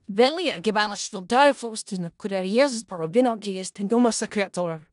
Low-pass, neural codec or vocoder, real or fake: 10.8 kHz; codec, 16 kHz in and 24 kHz out, 0.4 kbps, LongCat-Audio-Codec, four codebook decoder; fake